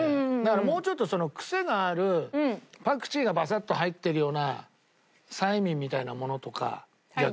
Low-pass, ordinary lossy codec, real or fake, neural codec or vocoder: none; none; real; none